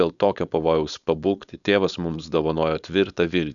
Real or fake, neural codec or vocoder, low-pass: fake; codec, 16 kHz, 4.8 kbps, FACodec; 7.2 kHz